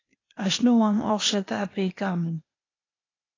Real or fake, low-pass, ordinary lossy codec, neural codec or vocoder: fake; 7.2 kHz; AAC, 32 kbps; codec, 16 kHz, 0.8 kbps, ZipCodec